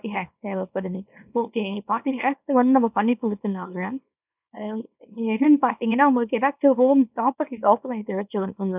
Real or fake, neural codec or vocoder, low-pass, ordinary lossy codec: fake; codec, 24 kHz, 0.9 kbps, WavTokenizer, small release; 3.6 kHz; none